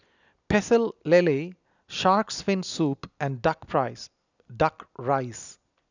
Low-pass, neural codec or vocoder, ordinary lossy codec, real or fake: 7.2 kHz; none; none; real